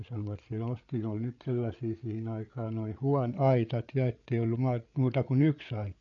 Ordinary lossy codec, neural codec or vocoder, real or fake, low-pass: none; codec, 16 kHz, 8 kbps, FreqCodec, larger model; fake; 7.2 kHz